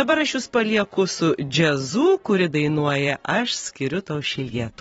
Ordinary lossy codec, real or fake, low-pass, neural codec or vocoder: AAC, 24 kbps; fake; 19.8 kHz; vocoder, 44.1 kHz, 128 mel bands every 512 samples, BigVGAN v2